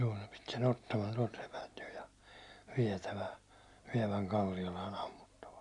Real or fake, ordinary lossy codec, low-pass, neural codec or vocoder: real; none; 10.8 kHz; none